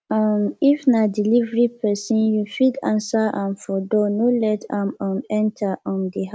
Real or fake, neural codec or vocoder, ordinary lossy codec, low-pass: real; none; none; none